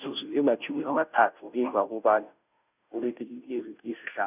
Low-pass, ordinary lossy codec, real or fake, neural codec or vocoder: 3.6 kHz; none; fake; codec, 16 kHz, 0.5 kbps, FunCodec, trained on Chinese and English, 25 frames a second